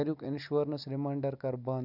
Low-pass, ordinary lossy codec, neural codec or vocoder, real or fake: 5.4 kHz; none; none; real